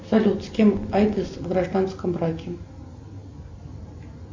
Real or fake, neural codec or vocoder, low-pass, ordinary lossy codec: real; none; 7.2 kHz; MP3, 48 kbps